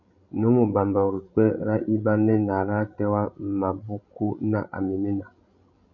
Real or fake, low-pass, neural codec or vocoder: fake; 7.2 kHz; codec, 16 kHz, 16 kbps, FreqCodec, larger model